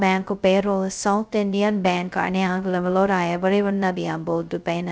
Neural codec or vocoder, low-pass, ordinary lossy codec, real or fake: codec, 16 kHz, 0.2 kbps, FocalCodec; none; none; fake